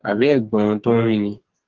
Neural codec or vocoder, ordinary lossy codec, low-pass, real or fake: codec, 44.1 kHz, 2.6 kbps, SNAC; Opus, 16 kbps; 7.2 kHz; fake